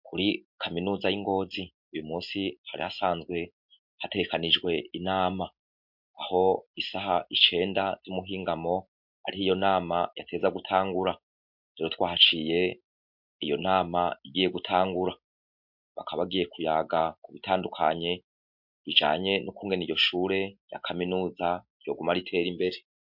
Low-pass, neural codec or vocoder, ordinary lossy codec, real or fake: 5.4 kHz; none; MP3, 48 kbps; real